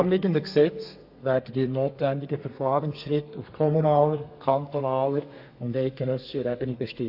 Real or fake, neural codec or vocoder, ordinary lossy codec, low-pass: fake; codec, 32 kHz, 1.9 kbps, SNAC; AAC, 48 kbps; 5.4 kHz